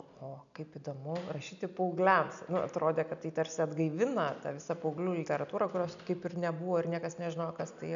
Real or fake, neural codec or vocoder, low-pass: real; none; 7.2 kHz